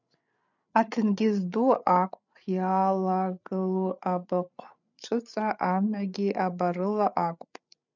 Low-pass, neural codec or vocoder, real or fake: 7.2 kHz; codec, 16 kHz, 8 kbps, FreqCodec, larger model; fake